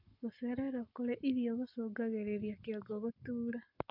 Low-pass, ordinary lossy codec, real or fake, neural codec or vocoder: 5.4 kHz; none; fake; codec, 44.1 kHz, 7.8 kbps, Pupu-Codec